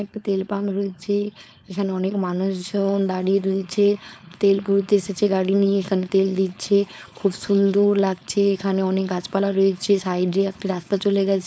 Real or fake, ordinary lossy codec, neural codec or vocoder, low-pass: fake; none; codec, 16 kHz, 4.8 kbps, FACodec; none